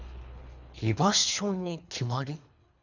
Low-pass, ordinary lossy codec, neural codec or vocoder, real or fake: 7.2 kHz; none; codec, 24 kHz, 3 kbps, HILCodec; fake